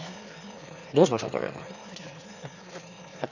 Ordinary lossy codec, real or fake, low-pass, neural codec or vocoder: none; fake; 7.2 kHz; autoencoder, 22.05 kHz, a latent of 192 numbers a frame, VITS, trained on one speaker